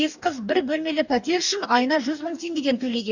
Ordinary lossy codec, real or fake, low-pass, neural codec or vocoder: none; fake; 7.2 kHz; codec, 44.1 kHz, 2.6 kbps, DAC